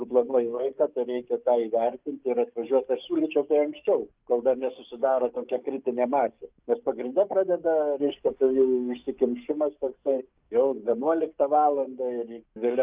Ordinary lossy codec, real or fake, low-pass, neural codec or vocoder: Opus, 24 kbps; fake; 3.6 kHz; codec, 44.1 kHz, 7.8 kbps, DAC